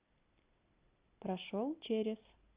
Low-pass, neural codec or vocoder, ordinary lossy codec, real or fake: 3.6 kHz; none; Opus, 64 kbps; real